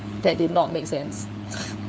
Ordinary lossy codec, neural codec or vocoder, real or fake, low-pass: none; codec, 16 kHz, 4 kbps, FunCodec, trained on LibriTTS, 50 frames a second; fake; none